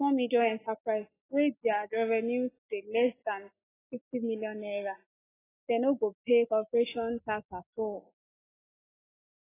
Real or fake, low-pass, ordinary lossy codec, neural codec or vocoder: real; 3.6 kHz; AAC, 16 kbps; none